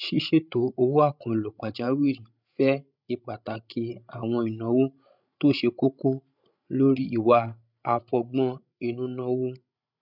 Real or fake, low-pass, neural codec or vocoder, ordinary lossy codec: fake; 5.4 kHz; codec, 16 kHz, 16 kbps, FreqCodec, larger model; none